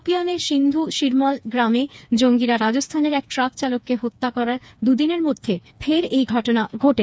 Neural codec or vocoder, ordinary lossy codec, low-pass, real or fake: codec, 16 kHz, 4 kbps, FreqCodec, smaller model; none; none; fake